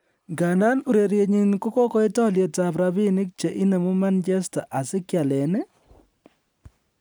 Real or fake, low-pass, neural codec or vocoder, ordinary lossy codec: real; none; none; none